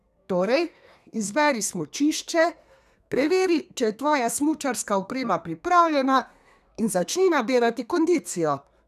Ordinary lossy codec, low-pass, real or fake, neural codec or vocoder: none; 14.4 kHz; fake; codec, 32 kHz, 1.9 kbps, SNAC